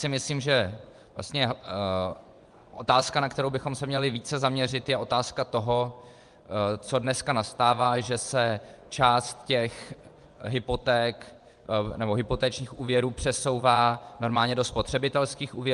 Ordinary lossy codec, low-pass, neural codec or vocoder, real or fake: Opus, 32 kbps; 10.8 kHz; vocoder, 24 kHz, 100 mel bands, Vocos; fake